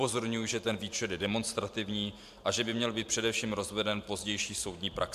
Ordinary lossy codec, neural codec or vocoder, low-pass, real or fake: MP3, 96 kbps; vocoder, 44.1 kHz, 128 mel bands every 512 samples, BigVGAN v2; 14.4 kHz; fake